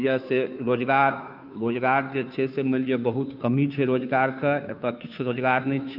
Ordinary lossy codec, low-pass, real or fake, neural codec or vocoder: none; 5.4 kHz; fake; codec, 16 kHz, 2 kbps, FunCodec, trained on Chinese and English, 25 frames a second